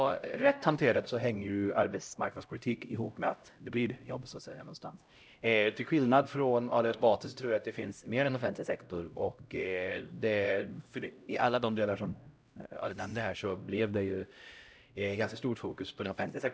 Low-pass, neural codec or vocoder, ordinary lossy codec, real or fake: none; codec, 16 kHz, 0.5 kbps, X-Codec, HuBERT features, trained on LibriSpeech; none; fake